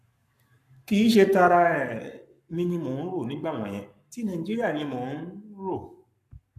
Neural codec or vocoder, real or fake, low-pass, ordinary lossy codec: codec, 44.1 kHz, 7.8 kbps, Pupu-Codec; fake; 14.4 kHz; none